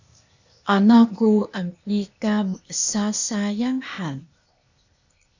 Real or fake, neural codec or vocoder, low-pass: fake; codec, 16 kHz, 0.8 kbps, ZipCodec; 7.2 kHz